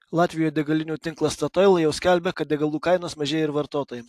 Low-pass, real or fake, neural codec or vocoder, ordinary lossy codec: 14.4 kHz; real; none; AAC, 64 kbps